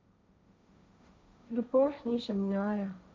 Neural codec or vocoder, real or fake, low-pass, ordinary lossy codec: codec, 16 kHz, 1.1 kbps, Voila-Tokenizer; fake; none; none